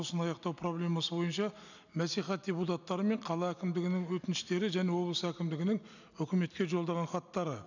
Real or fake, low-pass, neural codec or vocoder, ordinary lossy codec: real; 7.2 kHz; none; none